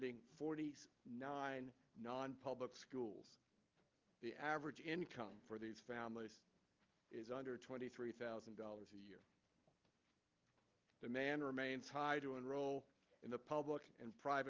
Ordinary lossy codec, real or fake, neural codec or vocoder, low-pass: Opus, 32 kbps; real; none; 7.2 kHz